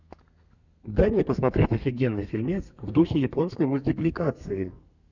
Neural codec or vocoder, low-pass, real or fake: codec, 32 kHz, 1.9 kbps, SNAC; 7.2 kHz; fake